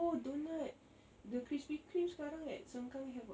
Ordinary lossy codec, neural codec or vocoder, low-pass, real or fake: none; none; none; real